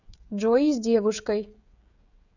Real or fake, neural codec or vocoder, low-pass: fake; codec, 16 kHz, 16 kbps, FreqCodec, smaller model; 7.2 kHz